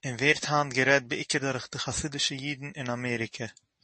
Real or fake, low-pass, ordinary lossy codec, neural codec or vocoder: real; 10.8 kHz; MP3, 32 kbps; none